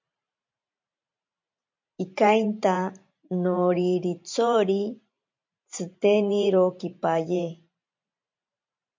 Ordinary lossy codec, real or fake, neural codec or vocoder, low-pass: MP3, 48 kbps; fake; vocoder, 44.1 kHz, 128 mel bands every 512 samples, BigVGAN v2; 7.2 kHz